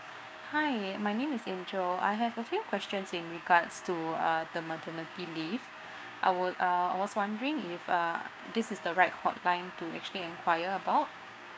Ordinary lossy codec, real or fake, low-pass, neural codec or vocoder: none; fake; none; codec, 16 kHz, 6 kbps, DAC